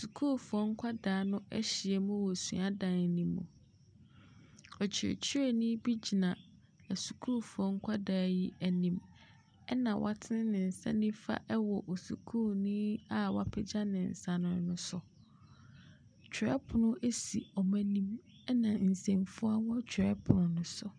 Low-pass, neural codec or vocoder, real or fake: 9.9 kHz; none; real